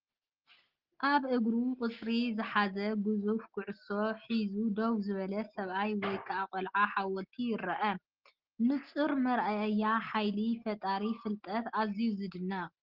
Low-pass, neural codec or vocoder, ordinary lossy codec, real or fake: 5.4 kHz; none; Opus, 16 kbps; real